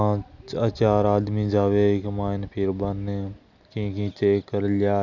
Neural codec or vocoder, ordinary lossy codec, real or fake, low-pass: none; none; real; 7.2 kHz